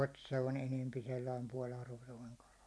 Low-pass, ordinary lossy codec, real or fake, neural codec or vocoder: none; none; real; none